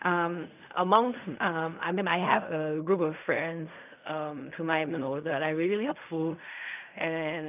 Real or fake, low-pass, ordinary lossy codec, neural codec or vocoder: fake; 3.6 kHz; none; codec, 16 kHz in and 24 kHz out, 0.4 kbps, LongCat-Audio-Codec, fine tuned four codebook decoder